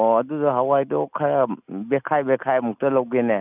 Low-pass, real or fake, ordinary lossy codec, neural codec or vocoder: 3.6 kHz; real; none; none